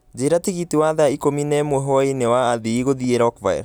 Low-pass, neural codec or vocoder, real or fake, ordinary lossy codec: none; none; real; none